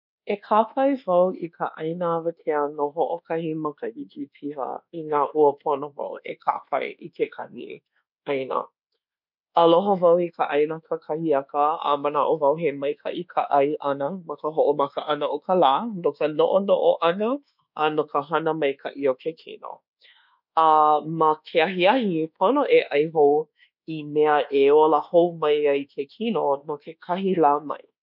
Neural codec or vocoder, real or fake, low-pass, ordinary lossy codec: codec, 24 kHz, 1.2 kbps, DualCodec; fake; 5.4 kHz; none